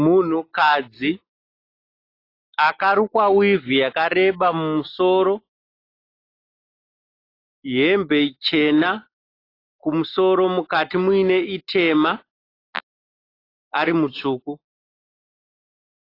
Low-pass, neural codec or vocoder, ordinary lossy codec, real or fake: 5.4 kHz; none; AAC, 32 kbps; real